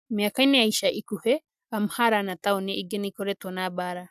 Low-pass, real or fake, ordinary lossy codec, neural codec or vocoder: 14.4 kHz; real; none; none